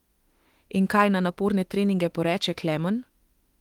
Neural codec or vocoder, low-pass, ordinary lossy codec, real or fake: autoencoder, 48 kHz, 32 numbers a frame, DAC-VAE, trained on Japanese speech; 19.8 kHz; Opus, 24 kbps; fake